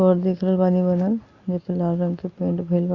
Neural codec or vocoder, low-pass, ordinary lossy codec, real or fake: none; 7.2 kHz; Opus, 64 kbps; real